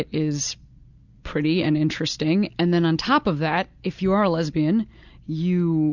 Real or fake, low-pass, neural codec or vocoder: real; 7.2 kHz; none